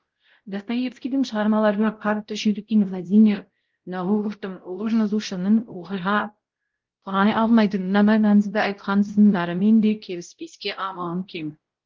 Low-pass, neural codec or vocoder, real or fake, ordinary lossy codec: 7.2 kHz; codec, 16 kHz, 0.5 kbps, X-Codec, WavLM features, trained on Multilingual LibriSpeech; fake; Opus, 16 kbps